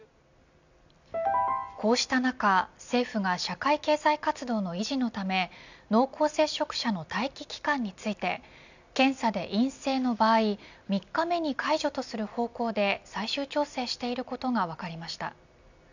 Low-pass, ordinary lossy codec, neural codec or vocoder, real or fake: 7.2 kHz; none; none; real